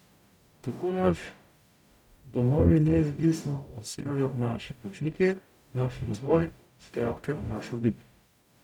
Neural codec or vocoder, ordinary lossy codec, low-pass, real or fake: codec, 44.1 kHz, 0.9 kbps, DAC; none; 19.8 kHz; fake